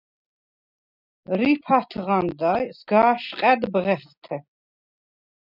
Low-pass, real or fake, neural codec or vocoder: 5.4 kHz; real; none